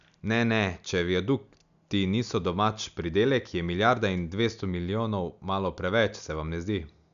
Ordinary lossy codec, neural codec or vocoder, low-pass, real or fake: none; none; 7.2 kHz; real